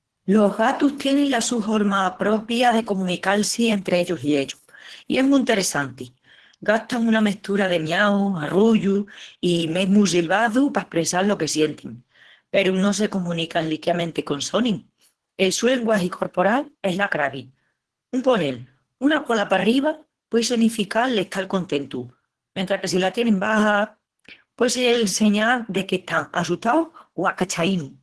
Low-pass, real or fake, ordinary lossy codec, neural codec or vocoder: 10.8 kHz; fake; Opus, 16 kbps; codec, 24 kHz, 3 kbps, HILCodec